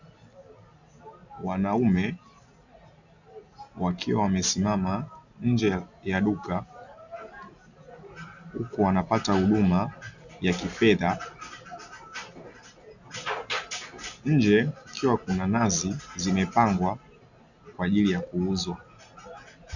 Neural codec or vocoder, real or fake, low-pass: none; real; 7.2 kHz